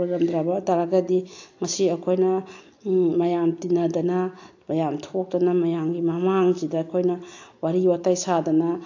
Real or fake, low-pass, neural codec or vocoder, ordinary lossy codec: real; 7.2 kHz; none; AAC, 48 kbps